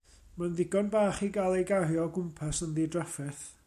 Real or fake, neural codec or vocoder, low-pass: real; none; 14.4 kHz